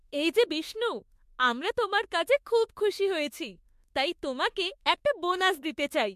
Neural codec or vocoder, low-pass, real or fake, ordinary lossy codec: autoencoder, 48 kHz, 32 numbers a frame, DAC-VAE, trained on Japanese speech; 14.4 kHz; fake; MP3, 64 kbps